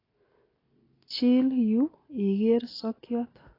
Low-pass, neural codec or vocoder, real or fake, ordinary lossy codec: 5.4 kHz; none; real; AAC, 24 kbps